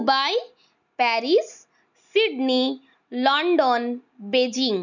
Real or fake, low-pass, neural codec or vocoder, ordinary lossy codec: real; 7.2 kHz; none; none